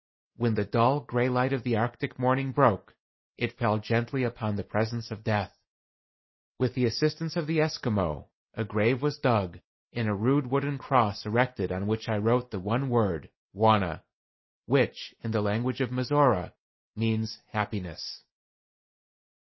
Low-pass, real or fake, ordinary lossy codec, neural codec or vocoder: 7.2 kHz; real; MP3, 24 kbps; none